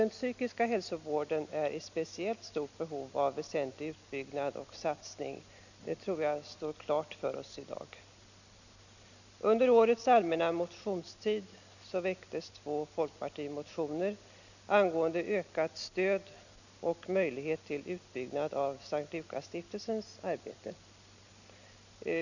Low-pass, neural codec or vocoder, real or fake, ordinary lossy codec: 7.2 kHz; none; real; none